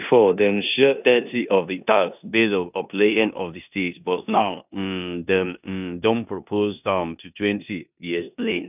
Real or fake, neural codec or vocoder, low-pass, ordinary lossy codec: fake; codec, 16 kHz in and 24 kHz out, 0.9 kbps, LongCat-Audio-Codec, four codebook decoder; 3.6 kHz; none